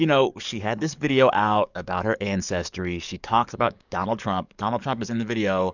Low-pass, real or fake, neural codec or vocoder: 7.2 kHz; fake; codec, 44.1 kHz, 7.8 kbps, DAC